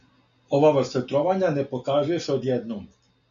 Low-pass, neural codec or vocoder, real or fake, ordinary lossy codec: 7.2 kHz; none; real; MP3, 96 kbps